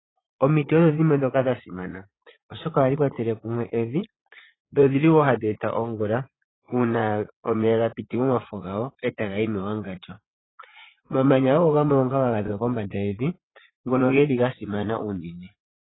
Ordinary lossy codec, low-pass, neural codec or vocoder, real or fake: AAC, 16 kbps; 7.2 kHz; vocoder, 44.1 kHz, 80 mel bands, Vocos; fake